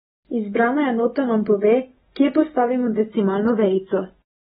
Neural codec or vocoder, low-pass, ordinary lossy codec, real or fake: none; 9.9 kHz; AAC, 16 kbps; real